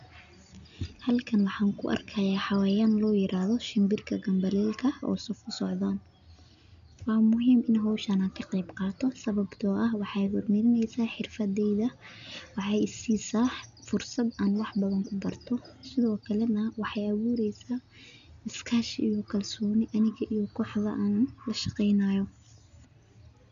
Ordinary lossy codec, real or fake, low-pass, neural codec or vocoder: none; real; 7.2 kHz; none